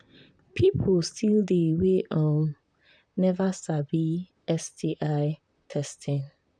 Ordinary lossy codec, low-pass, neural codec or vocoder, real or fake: none; 9.9 kHz; vocoder, 44.1 kHz, 128 mel bands, Pupu-Vocoder; fake